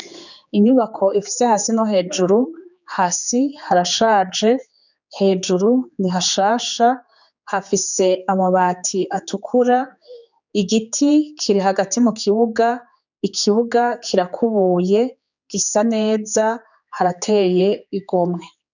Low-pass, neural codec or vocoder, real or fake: 7.2 kHz; codec, 16 kHz, 4 kbps, X-Codec, HuBERT features, trained on general audio; fake